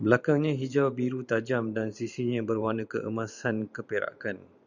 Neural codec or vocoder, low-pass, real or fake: vocoder, 44.1 kHz, 128 mel bands every 512 samples, BigVGAN v2; 7.2 kHz; fake